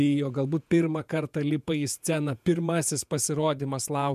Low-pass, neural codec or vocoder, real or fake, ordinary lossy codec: 14.4 kHz; vocoder, 48 kHz, 128 mel bands, Vocos; fake; MP3, 96 kbps